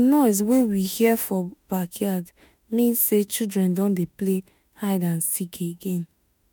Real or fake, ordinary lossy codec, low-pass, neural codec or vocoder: fake; none; none; autoencoder, 48 kHz, 32 numbers a frame, DAC-VAE, trained on Japanese speech